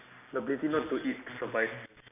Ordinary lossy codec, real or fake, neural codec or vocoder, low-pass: none; real; none; 3.6 kHz